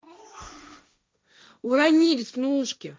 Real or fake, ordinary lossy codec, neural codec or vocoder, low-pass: fake; none; codec, 16 kHz, 1.1 kbps, Voila-Tokenizer; none